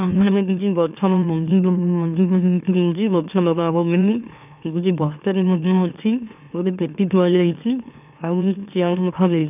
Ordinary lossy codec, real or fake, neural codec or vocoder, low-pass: none; fake; autoencoder, 44.1 kHz, a latent of 192 numbers a frame, MeloTTS; 3.6 kHz